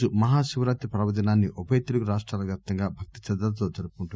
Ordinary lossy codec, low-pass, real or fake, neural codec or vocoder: none; none; real; none